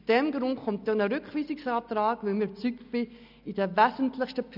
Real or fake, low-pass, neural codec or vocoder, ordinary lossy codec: real; 5.4 kHz; none; none